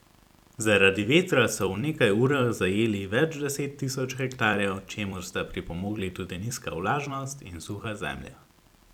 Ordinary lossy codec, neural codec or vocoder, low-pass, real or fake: none; vocoder, 44.1 kHz, 128 mel bands every 256 samples, BigVGAN v2; 19.8 kHz; fake